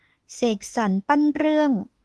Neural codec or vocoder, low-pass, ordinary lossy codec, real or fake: autoencoder, 48 kHz, 32 numbers a frame, DAC-VAE, trained on Japanese speech; 10.8 kHz; Opus, 16 kbps; fake